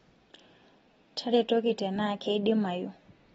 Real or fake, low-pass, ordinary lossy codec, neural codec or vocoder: real; 19.8 kHz; AAC, 24 kbps; none